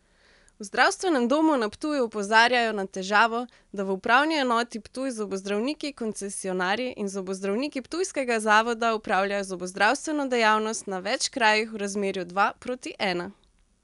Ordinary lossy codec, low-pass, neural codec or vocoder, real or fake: none; 10.8 kHz; none; real